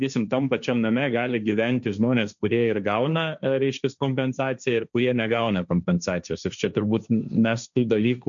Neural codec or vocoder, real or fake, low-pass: codec, 16 kHz, 1.1 kbps, Voila-Tokenizer; fake; 7.2 kHz